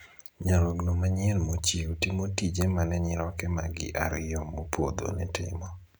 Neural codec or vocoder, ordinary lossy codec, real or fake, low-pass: none; none; real; none